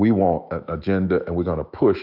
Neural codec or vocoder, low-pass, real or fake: autoencoder, 48 kHz, 128 numbers a frame, DAC-VAE, trained on Japanese speech; 5.4 kHz; fake